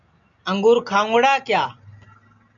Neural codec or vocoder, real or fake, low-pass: none; real; 7.2 kHz